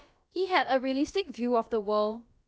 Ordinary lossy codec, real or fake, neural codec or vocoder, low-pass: none; fake; codec, 16 kHz, about 1 kbps, DyCAST, with the encoder's durations; none